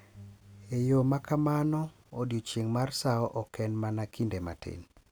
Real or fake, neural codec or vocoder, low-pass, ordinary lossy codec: real; none; none; none